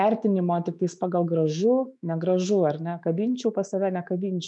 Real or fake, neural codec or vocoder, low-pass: fake; autoencoder, 48 kHz, 128 numbers a frame, DAC-VAE, trained on Japanese speech; 10.8 kHz